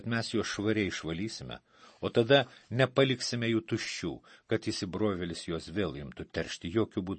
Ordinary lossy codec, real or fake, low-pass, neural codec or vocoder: MP3, 32 kbps; real; 10.8 kHz; none